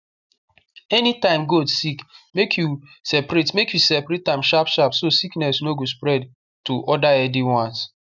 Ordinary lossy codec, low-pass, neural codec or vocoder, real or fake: none; 7.2 kHz; none; real